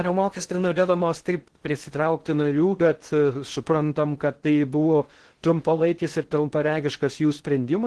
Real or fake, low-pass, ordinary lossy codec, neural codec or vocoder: fake; 10.8 kHz; Opus, 16 kbps; codec, 16 kHz in and 24 kHz out, 0.6 kbps, FocalCodec, streaming, 2048 codes